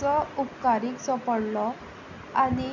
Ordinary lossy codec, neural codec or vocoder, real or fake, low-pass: none; none; real; 7.2 kHz